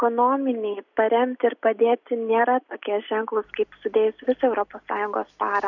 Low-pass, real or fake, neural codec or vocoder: 7.2 kHz; real; none